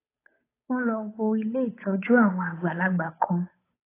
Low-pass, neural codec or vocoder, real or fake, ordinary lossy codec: 3.6 kHz; codec, 16 kHz, 8 kbps, FunCodec, trained on Chinese and English, 25 frames a second; fake; AAC, 24 kbps